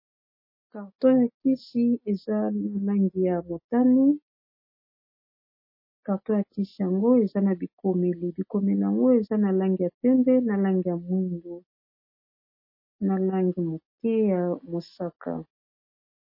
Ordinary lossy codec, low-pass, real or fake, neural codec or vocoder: MP3, 24 kbps; 5.4 kHz; real; none